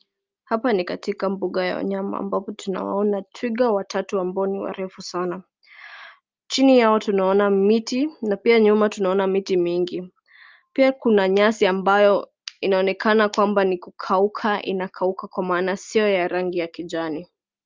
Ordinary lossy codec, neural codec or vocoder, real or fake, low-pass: Opus, 24 kbps; none; real; 7.2 kHz